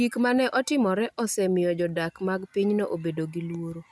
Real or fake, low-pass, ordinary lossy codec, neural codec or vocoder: real; none; none; none